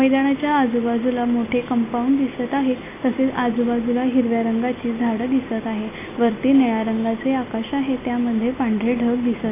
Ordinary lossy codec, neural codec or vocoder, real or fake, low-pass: AAC, 24 kbps; none; real; 3.6 kHz